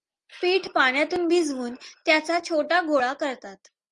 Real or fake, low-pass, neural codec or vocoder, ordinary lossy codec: real; 10.8 kHz; none; Opus, 32 kbps